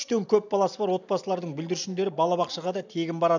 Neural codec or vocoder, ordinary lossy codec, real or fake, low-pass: none; none; real; 7.2 kHz